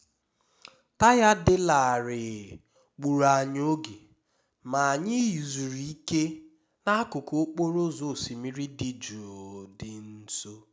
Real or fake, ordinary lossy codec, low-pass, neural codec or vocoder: real; none; none; none